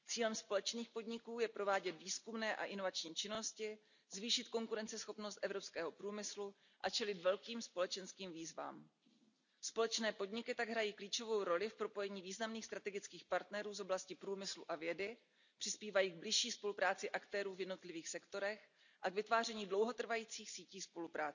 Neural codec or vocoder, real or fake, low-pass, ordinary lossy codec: none; real; 7.2 kHz; none